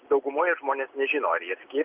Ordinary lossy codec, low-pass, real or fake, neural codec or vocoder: Opus, 32 kbps; 3.6 kHz; real; none